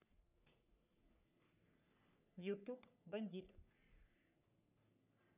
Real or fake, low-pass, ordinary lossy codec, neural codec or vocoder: fake; 3.6 kHz; none; codec, 44.1 kHz, 3.4 kbps, Pupu-Codec